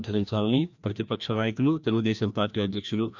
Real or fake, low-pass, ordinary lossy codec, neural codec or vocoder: fake; 7.2 kHz; none; codec, 16 kHz, 1 kbps, FreqCodec, larger model